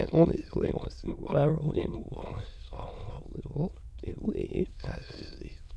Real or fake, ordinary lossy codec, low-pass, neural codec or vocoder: fake; none; none; autoencoder, 22.05 kHz, a latent of 192 numbers a frame, VITS, trained on many speakers